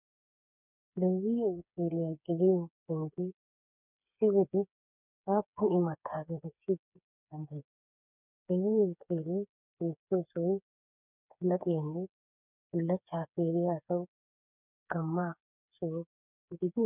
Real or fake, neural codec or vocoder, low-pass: fake; codec, 16 kHz, 4 kbps, FreqCodec, smaller model; 3.6 kHz